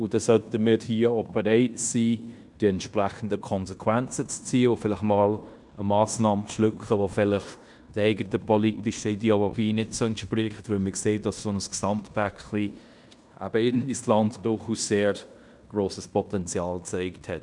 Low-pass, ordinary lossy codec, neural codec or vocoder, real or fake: 10.8 kHz; none; codec, 16 kHz in and 24 kHz out, 0.9 kbps, LongCat-Audio-Codec, fine tuned four codebook decoder; fake